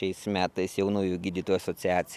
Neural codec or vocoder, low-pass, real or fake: none; 14.4 kHz; real